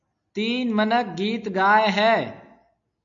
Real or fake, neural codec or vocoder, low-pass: real; none; 7.2 kHz